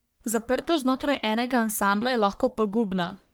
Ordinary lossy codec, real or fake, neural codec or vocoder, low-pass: none; fake; codec, 44.1 kHz, 1.7 kbps, Pupu-Codec; none